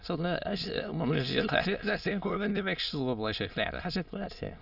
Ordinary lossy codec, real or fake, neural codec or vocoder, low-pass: none; fake; autoencoder, 22.05 kHz, a latent of 192 numbers a frame, VITS, trained on many speakers; 5.4 kHz